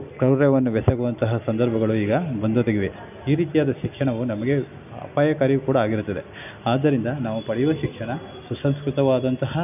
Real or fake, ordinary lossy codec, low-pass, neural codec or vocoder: real; none; 3.6 kHz; none